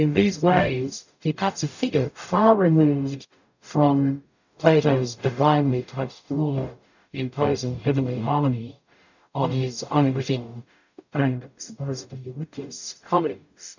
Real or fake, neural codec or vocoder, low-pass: fake; codec, 44.1 kHz, 0.9 kbps, DAC; 7.2 kHz